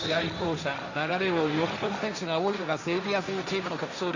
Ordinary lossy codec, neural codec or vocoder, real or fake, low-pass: Opus, 64 kbps; codec, 16 kHz, 1.1 kbps, Voila-Tokenizer; fake; 7.2 kHz